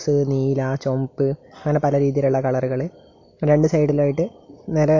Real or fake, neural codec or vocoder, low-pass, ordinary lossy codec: real; none; 7.2 kHz; AAC, 48 kbps